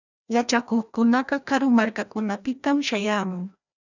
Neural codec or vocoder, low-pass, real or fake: codec, 16 kHz, 1 kbps, FreqCodec, larger model; 7.2 kHz; fake